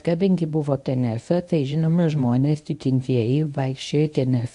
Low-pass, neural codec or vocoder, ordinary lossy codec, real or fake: 10.8 kHz; codec, 24 kHz, 0.9 kbps, WavTokenizer, medium speech release version 1; AAC, 64 kbps; fake